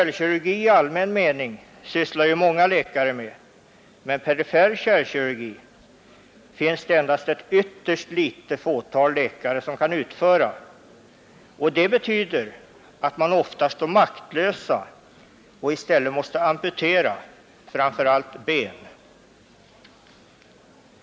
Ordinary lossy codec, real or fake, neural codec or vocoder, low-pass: none; real; none; none